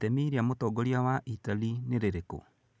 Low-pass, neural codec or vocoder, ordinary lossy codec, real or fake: none; none; none; real